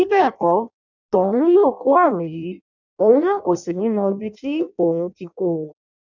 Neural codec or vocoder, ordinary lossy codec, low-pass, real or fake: codec, 16 kHz in and 24 kHz out, 0.6 kbps, FireRedTTS-2 codec; none; 7.2 kHz; fake